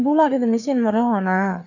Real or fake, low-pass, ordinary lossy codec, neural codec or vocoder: fake; 7.2 kHz; none; codec, 16 kHz, 4 kbps, FreqCodec, larger model